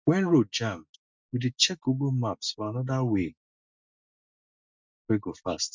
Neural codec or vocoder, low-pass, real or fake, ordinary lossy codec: none; 7.2 kHz; real; MP3, 64 kbps